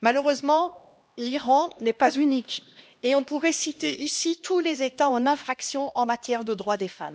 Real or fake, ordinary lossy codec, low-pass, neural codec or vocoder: fake; none; none; codec, 16 kHz, 1 kbps, X-Codec, HuBERT features, trained on LibriSpeech